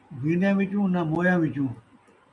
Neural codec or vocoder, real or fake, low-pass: none; real; 10.8 kHz